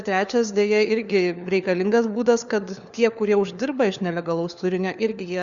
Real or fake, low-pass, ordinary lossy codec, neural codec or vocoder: fake; 7.2 kHz; Opus, 64 kbps; codec, 16 kHz, 4 kbps, FunCodec, trained on LibriTTS, 50 frames a second